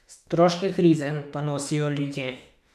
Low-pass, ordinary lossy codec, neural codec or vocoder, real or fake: 14.4 kHz; none; autoencoder, 48 kHz, 32 numbers a frame, DAC-VAE, trained on Japanese speech; fake